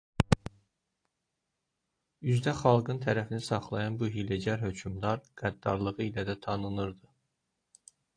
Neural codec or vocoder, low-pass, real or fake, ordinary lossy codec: none; 9.9 kHz; real; AAC, 48 kbps